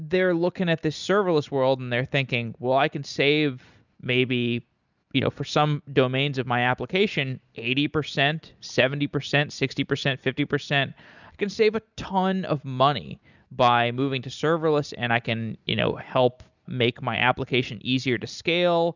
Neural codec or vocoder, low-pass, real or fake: none; 7.2 kHz; real